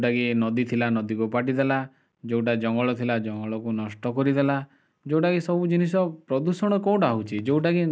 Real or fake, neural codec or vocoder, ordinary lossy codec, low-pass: real; none; none; none